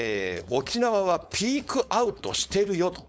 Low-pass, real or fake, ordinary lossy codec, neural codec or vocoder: none; fake; none; codec, 16 kHz, 4.8 kbps, FACodec